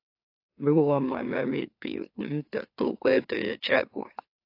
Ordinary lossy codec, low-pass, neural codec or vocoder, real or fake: AAC, 32 kbps; 5.4 kHz; autoencoder, 44.1 kHz, a latent of 192 numbers a frame, MeloTTS; fake